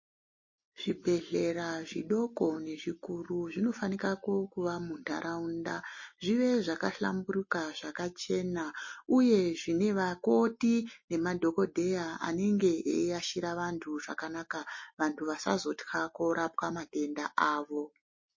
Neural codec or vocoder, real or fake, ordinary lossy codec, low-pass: none; real; MP3, 32 kbps; 7.2 kHz